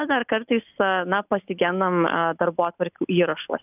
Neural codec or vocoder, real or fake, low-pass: none; real; 3.6 kHz